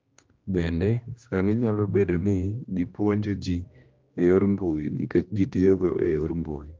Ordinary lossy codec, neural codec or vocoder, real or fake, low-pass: Opus, 24 kbps; codec, 16 kHz, 1 kbps, X-Codec, HuBERT features, trained on general audio; fake; 7.2 kHz